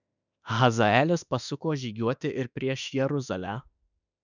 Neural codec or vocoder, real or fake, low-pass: autoencoder, 48 kHz, 32 numbers a frame, DAC-VAE, trained on Japanese speech; fake; 7.2 kHz